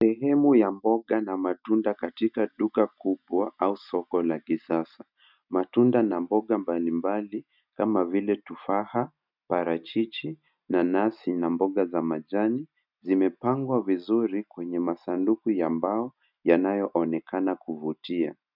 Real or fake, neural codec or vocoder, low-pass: real; none; 5.4 kHz